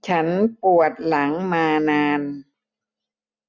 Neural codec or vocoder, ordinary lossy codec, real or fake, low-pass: none; none; real; 7.2 kHz